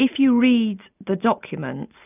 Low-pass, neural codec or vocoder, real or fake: 3.6 kHz; none; real